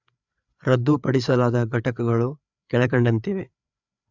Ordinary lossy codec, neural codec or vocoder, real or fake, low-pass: none; codec, 16 kHz, 4 kbps, FreqCodec, larger model; fake; 7.2 kHz